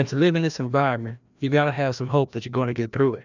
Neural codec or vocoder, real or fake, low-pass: codec, 16 kHz, 1 kbps, FreqCodec, larger model; fake; 7.2 kHz